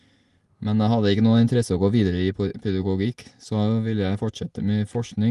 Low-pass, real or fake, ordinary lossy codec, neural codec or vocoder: 10.8 kHz; real; Opus, 24 kbps; none